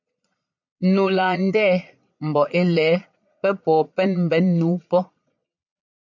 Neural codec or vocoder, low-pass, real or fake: vocoder, 22.05 kHz, 80 mel bands, Vocos; 7.2 kHz; fake